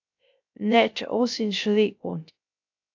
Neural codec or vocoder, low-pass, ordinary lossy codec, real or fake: codec, 16 kHz, 0.3 kbps, FocalCodec; 7.2 kHz; AAC, 48 kbps; fake